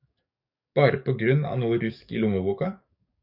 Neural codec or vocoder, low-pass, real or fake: codec, 44.1 kHz, 7.8 kbps, DAC; 5.4 kHz; fake